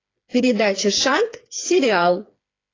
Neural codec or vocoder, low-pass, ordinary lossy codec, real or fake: codec, 16 kHz, 4 kbps, FreqCodec, smaller model; 7.2 kHz; AAC, 32 kbps; fake